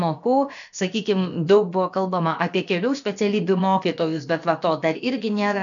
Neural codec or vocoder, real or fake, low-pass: codec, 16 kHz, about 1 kbps, DyCAST, with the encoder's durations; fake; 7.2 kHz